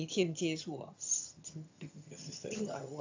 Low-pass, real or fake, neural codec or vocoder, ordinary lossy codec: 7.2 kHz; fake; vocoder, 22.05 kHz, 80 mel bands, HiFi-GAN; AAC, 48 kbps